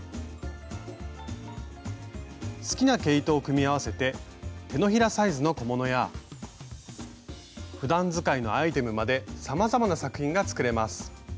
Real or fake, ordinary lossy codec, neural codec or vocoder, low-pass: real; none; none; none